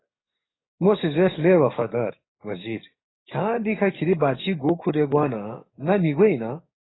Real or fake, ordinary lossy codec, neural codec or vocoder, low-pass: fake; AAC, 16 kbps; codec, 44.1 kHz, 7.8 kbps, DAC; 7.2 kHz